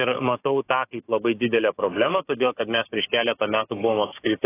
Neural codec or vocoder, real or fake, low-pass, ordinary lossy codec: codec, 16 kHz, 6 kbps, DAC; fake; 3.6 kHz; AAC, 16 kbps